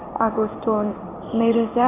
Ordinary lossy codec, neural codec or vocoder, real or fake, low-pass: none; codec, 16 kHz in and 24 kHz out, 1 kbps, XY-Tokenizer; fake; 3.6 kHz